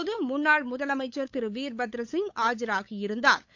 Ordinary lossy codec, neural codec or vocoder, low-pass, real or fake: AAC, 48 kbps; codec, 16 kHz, 8 kbps, FunCodec, trained on LibriTTS, 25 frames a second; 7.2 kHz; fake